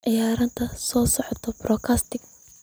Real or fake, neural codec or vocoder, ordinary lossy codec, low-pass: real; none; none; none